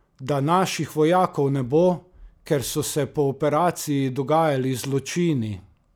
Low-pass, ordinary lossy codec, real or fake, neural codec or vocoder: none; none; real; none